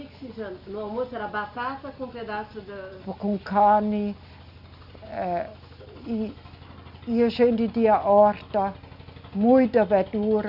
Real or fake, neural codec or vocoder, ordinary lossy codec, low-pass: real; none; none; 5.4 kHz